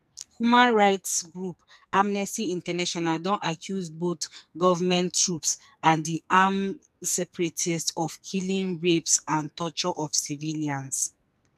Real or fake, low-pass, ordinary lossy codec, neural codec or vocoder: fake; 14.4 kHz; none; codec, 44.1 kHz, 2.6 kbps, SNAC